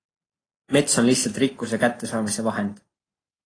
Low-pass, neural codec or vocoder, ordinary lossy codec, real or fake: 9.9 kHz; none; AAC, 32 kbps; real